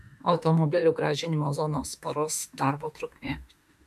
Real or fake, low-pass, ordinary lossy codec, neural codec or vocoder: fake; 14.4 kHz; AAC, 96 kbps; autoencoder, 48 kHz, 32 numbers a frame, DAC-VAE, trained on Japanese speech